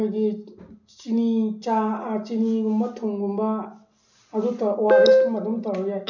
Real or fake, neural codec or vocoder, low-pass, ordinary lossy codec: real; none; 7.2 kHz; none